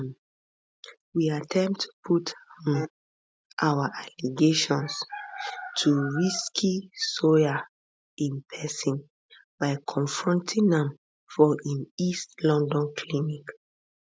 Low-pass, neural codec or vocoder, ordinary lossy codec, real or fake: none; none; none; real